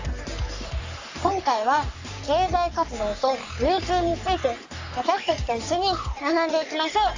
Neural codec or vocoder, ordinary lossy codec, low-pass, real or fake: codec, 44.1 kHz, 3.4 kbps, Pupu-Codec; AAC, 48 kbps; 7.2 kHz; fake